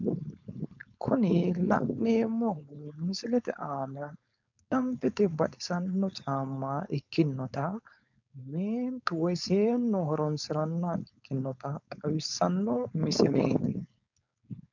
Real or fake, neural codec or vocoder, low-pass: fake; codec, 16 kHz, 4.8 kbps, FACodec; 7.2 kHz